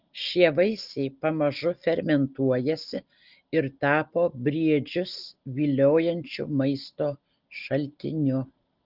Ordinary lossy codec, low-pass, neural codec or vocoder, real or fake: Opus, 32 kbps; 5.4 kHz; none; real